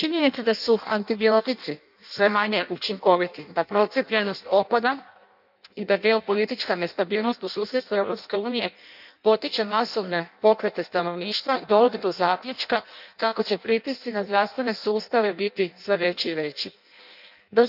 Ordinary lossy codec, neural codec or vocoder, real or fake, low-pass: none; codec, 16 kHz in and 24 kHz out, 0.6 kbps, FireRedTTS-2 codec; fake; 5.4 kHz